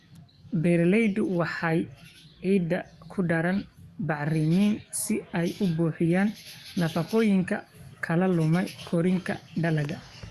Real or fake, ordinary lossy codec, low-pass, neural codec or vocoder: fake; Opus, 64 kbps; 14.4 kHz; codec, 44.1 kHz, 7.8 kbps, DAC